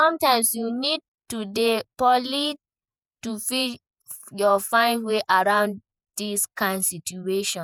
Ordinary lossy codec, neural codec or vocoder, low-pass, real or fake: none; vocoder, 48 kHz, 128 mel bands, Vocos; none; fake